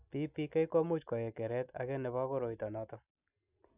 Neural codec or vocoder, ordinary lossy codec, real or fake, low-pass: none; none; real; 3.6 kHz